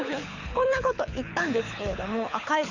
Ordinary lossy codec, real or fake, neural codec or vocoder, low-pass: none; fake; codec, 24 kHz, 6 kbps, HILCodec; 7.2 kHz